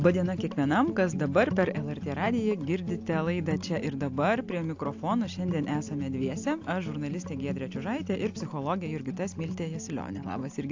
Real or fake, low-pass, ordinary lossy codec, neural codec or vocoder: real; 7.2 kHz; AAC, 48 kbps; none